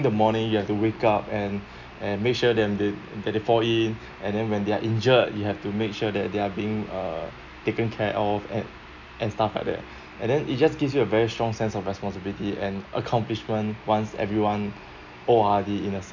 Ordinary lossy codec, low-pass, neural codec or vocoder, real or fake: none; 7.2 kHz; none; real